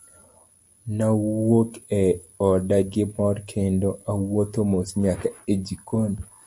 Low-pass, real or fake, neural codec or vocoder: 10.8 kHz; real; none